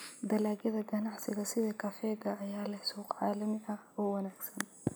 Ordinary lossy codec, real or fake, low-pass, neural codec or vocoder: none; real; none; none